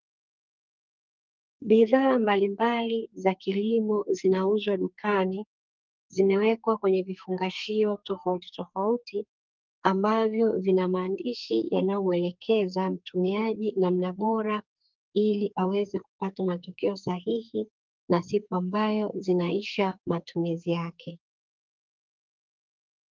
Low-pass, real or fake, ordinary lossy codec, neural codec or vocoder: 7.2 kHz; fake; Opus, 24 kbps; codec, 44.1 kHz, 2.6 kbps, SNAC